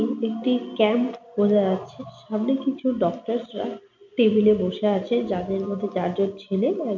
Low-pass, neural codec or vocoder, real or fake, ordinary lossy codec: 7.2 kHz; none; real; none